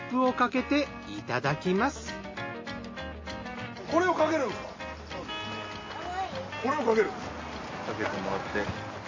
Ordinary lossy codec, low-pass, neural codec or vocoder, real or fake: MP3, 32 kbps; 7.2 kHz; none; real